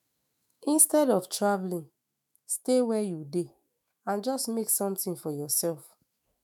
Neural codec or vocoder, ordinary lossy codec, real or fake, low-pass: autoencoder, 48 kHz, 128 numbers a frame, DAC-VAE, trained on Japanese speech; none; fake; none